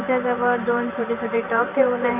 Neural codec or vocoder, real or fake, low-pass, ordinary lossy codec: vocoder, 44.1 kHz, 128 mel bands every 512 samples, BigVGAN v2; fake; 3.6 kHz; none